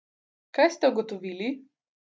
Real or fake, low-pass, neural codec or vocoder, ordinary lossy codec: real; none; none; none